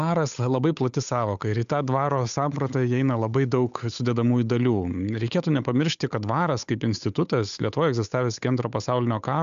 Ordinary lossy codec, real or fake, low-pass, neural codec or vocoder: AAC, 96 kbps; fake; 7.2 kHz; codec, 16 kHz, 8 kbps, FunCodec, trained on Chinese and English, 25 frames a second